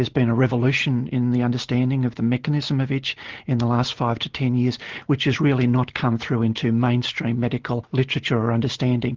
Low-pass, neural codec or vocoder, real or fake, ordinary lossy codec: 7.2 kHz; none; real; Opus, 16 kbps